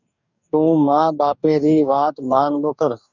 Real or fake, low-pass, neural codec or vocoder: fake; 7.2 kHz; codec, 44.1 kHz, 2.6 kbps, DAC